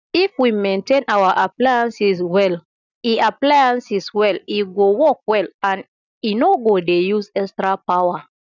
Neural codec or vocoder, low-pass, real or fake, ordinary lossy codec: none; 7.2 kHz; real; none